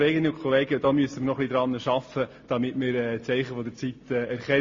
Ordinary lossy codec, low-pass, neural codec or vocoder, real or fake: MP3, 32 kbps; 7.2 kHz; none; real